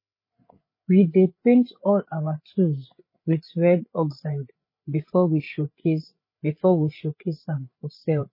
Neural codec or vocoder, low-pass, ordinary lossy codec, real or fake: codec, 16 kHz, 8 kbps, FreqCodec, larger model; 5.4 kHz; MP3, 24 kbps; fake